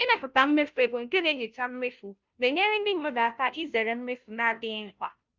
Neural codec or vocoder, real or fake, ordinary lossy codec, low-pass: codec, 16 kHz, 0.5 kbps, FunCodec, trained on Chinese and English, 25 frames a second; fake; Opus, 24 kbps; 7.2 kHz